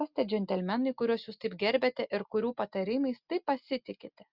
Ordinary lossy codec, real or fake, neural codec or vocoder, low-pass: Opus, 64 kbps; real; none; 5.4 kHz